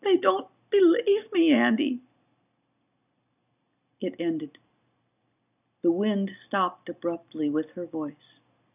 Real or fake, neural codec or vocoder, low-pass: real; none; 3.6 kHz